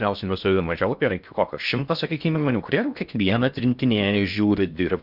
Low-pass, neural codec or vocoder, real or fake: 5.4 kHz; codec, 16 kHz in and 24 kHz out, 0.6 kbps, FocalCodec, streaming, 4096 codes; fake